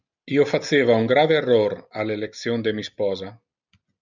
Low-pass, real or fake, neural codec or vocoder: 7.2 kHz; real; none